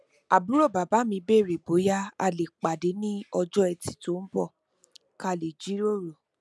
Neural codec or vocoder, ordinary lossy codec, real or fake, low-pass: none; none; real; none